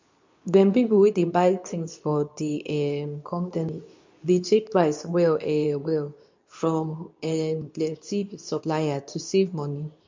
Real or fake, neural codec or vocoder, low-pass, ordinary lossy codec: fake; codec, 24 kHz, 0.9 kbps, WavTokenizer, medium speech release version 2; 7.2 kHz; MP3, 64 kbps